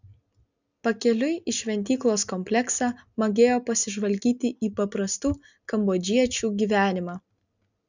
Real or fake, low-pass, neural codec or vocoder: real; 7.2 kHz; none